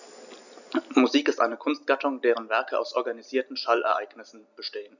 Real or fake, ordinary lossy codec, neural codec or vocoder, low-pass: real; none; none; 7.2 kHz